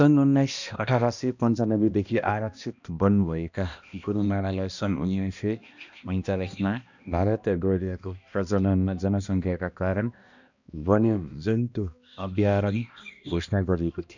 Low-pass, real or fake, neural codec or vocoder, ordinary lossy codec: 7.2 kHz; fake; codec, 16 kHz, 1 kbps, X-Codec, HuBERT features, trained on balanced general audio; none